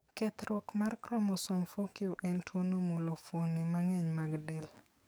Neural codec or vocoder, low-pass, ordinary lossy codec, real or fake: codec, 44.1 kHz, 7.8 kbps, DAC; none; none; fake